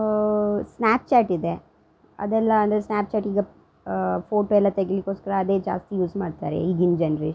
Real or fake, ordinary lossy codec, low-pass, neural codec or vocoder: real; none; none; none